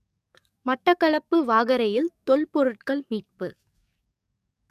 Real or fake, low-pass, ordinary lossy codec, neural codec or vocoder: fake; 14.4 kHz; none; codec, 44.1 kHz, 7.8 kbps, DAC